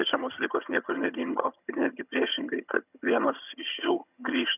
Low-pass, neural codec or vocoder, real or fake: 3.6 kHz; vocoder, 22.05 kHz, 80 mel bands, HiFi-GAN; fake